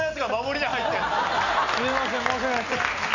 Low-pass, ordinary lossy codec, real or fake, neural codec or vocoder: 7.2 kHz; none; real; none